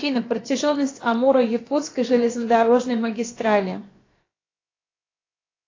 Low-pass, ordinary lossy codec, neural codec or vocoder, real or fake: 7.2 kHz; AAC, 32 kbps; codec, 16 kHz, about 1 kbps, DyCAST, with the encoder's durations; fake